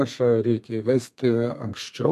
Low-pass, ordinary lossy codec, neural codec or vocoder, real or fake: 14.4 kHz; MP3, 64 kbps; codec, 32 kHz, 1.9 kbps, SNAC; fake